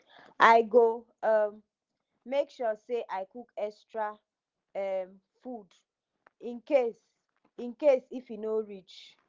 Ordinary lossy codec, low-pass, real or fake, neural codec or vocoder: Opus, 16 kbps; 7.2 kHz; real; none